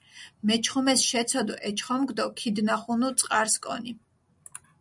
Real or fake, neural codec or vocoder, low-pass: real; none; 10.8 kHz